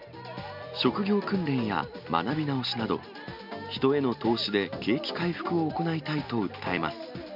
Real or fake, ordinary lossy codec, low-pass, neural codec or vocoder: real; none; 5.4 kHz; none